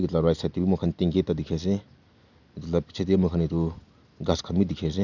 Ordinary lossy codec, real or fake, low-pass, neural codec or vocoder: none; real; 7.2 kHz; none